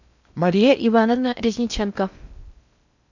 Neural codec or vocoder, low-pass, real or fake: codec, 16 kHz in and 24 kHz out, 0.6 kbps, FocalCodec, streaming, 2048 codes; 7.2 kHz; fake